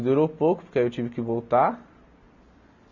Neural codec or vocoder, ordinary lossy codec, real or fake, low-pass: none; none; real; 7.2 kHz